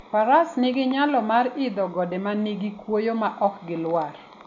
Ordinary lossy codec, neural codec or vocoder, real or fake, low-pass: none; none; real; 7.2 kHz